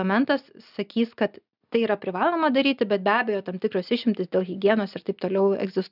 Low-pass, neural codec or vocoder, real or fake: 5.4 kHz; none; real